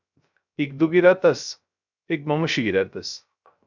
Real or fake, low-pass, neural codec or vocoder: fake; 7.2 kHz; codec, 16 kHz, 0.3 kbps, FocalCodec